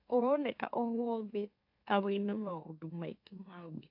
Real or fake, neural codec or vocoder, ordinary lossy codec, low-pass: fake; autoencoder, 44.1 kHz, a latent of 192 numbers a frame, MeloTTS; AAC, 48 kbps; 5.4 kHz